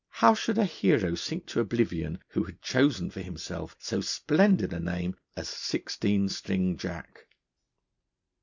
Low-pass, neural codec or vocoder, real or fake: 7.2 kHz; none; real